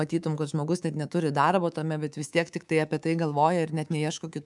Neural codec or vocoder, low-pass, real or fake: codec, 24 kHz, 3.1 kbps, DualCodec; 10.8 kHz; fake